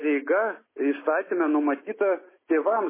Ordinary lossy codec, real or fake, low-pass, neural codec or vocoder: MP3, 16 kbps; real; 3.6 kHz; none